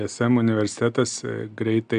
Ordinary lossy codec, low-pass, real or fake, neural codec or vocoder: MP3, 96 kbps; 9.9 kHz; real; none